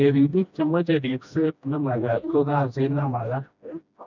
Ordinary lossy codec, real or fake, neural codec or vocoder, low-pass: none; fake; codec, 16 kHz, 1 kbps, FreqCodec, smaller model; 7.2 kHz